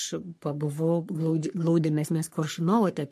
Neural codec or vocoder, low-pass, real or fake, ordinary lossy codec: codec, 44.1 kHz, 3.4 kbps, Pupu-Codec; 14.4 kHz; fake; MP3, 64 kbps